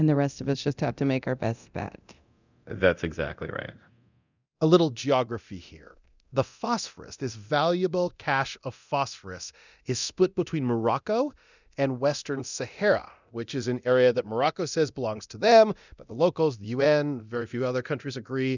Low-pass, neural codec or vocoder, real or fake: 7.2 kHz; codec, 24 kHz, 0.9 kbps, DualCodec; fake